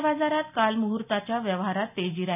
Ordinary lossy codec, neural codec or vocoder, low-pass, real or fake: none; none; 3.6 kHz; real